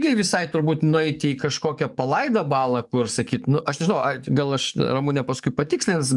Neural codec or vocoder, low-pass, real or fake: codec, 44.1 kHz, 7.8 kbps, DAC; 10.8 kHz; fake